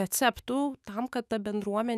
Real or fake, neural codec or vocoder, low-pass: fake; autoencoder, 48 kHz, 128 numbers a frame, DAC-VAE, trained on Japanese speech; 14.4 kHz